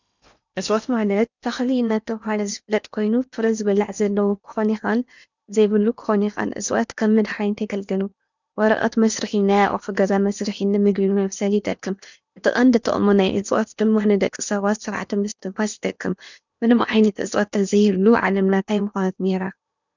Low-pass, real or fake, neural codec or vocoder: 7.2 kHz; fake; codec, 16 kHz in and 24 kHz out, 0.8 kbps, FocalCodec, streaming, 65536 codes